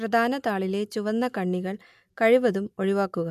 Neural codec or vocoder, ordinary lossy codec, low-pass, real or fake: none; MP3, 96 kbps; 14.4 kHz; real